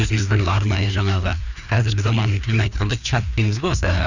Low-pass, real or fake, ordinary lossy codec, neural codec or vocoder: 7.2 kHz; fake; none; codec, 16 kHz, 2 kbps, FreqCodec, larger model